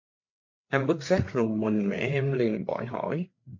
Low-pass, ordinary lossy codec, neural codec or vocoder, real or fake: 7.2 kHz; MP3, 48 kbps; codec, 16 kHz, 8 kbps, FreqCodec, larger model; fake